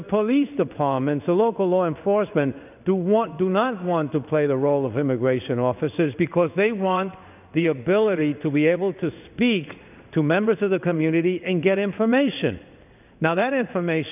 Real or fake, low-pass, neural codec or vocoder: fake; 3.6 kHz; codec, 16 kHz in and 24 kHz out, 1 kbps, XY-Tokenizer